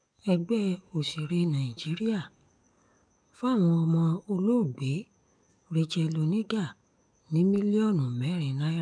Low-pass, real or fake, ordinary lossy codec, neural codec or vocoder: 9.9 kHz; fake; MP3, 96 kbps; codec, 44.1 kHz, 7.8 kbps, DAC